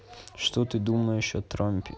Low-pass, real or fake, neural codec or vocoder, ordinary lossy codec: none; real; none; none